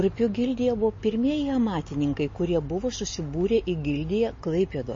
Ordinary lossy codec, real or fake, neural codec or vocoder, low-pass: MP3, 32 kbps; real; none; 7.2 kHz